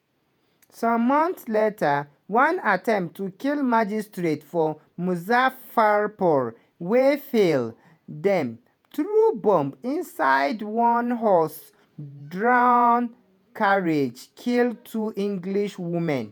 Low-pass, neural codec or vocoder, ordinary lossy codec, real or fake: none; vocoder, 48 kHz, 128 mel bands, Vocos; none; fake